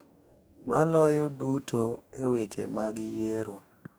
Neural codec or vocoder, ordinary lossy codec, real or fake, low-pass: codec, 44.1 kHz, 2.6 kbps, DAC; none; fake; none